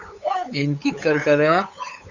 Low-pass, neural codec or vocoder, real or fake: 7.2 kHz; codec, 16 kHz, 8 kbps, FunCodec, trained on LibriTTS, 25 frames a second; fake